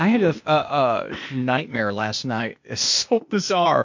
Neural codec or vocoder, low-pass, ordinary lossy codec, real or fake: codec, 16 kHz, 0.8 kbps, ZipCodec; 7.2 kHz; MP3, 48 kbps; fake